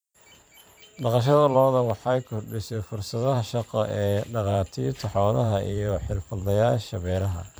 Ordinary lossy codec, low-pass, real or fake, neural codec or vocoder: none; none; fake; vocoder, 44.1 kHz, 128 mel bands every 512 samples, BigVGAN v2